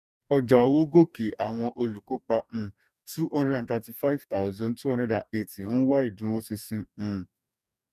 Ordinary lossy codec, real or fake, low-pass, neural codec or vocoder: none; fake; 14.4 kHz; codec, 44.1 kHz, 2.6 kbps, DAC